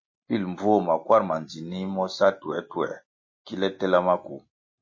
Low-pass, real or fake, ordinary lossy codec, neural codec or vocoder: 7.2 kHz; real; MP3, 32 kbps; none